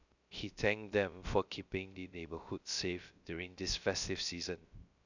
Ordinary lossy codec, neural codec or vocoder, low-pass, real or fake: none; codec, 16 kHz, 0.3 kbps, FocalCodec; 7.2 kHz; fake